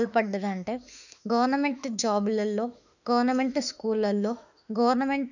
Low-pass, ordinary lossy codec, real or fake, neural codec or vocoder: 7.2 kHz; none; fake; autoencoder, 48 kHz, 32 numbers a frame, DAC-VAE, trained on Japanese speech